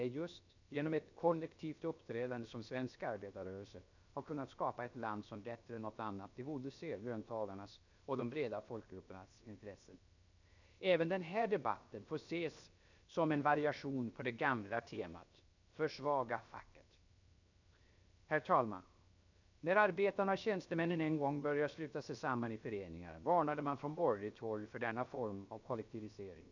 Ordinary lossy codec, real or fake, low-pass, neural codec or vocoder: none; fake; 7.2 kHz; codec, 16 kHz, 0.7 kbps, FocalCodec